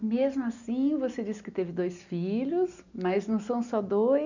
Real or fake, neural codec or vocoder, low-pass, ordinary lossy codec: real; none; 7.2 kHz; none